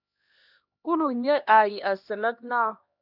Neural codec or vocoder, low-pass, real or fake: codec, 16 kHz, 1 kbps, X-Codec, HuBERT features, trained on LibriSpeech; 5.4 kHz; fake